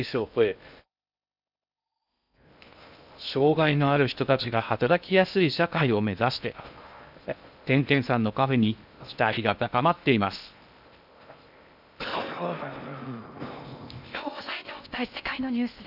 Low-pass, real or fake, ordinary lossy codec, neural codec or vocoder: 5.4 kHz; fake; none; codec, 16 kHz in and 24 kHz out, 0.6 kbps, FocalCodec, streaming, 2048 codes